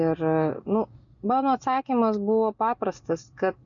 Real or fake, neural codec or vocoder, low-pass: real; none; 7.2 kHz